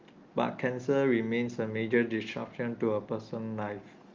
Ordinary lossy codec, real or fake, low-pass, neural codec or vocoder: Opus, 32 kbps; real; 7.2 kHz; none